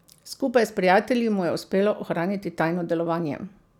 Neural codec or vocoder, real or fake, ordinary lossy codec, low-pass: none; real; none; 19.8 kHz